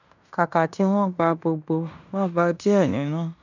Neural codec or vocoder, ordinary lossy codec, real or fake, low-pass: codec, 16 kHz in and 24 kHz out, 0.9 kbps, LongCat-Audio-Codec, fine tuned four codebook decoder; none; fake; 7.2 kHz